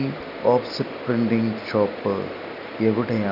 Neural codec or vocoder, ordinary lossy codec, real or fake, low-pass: none; none; real; 5.4 kHz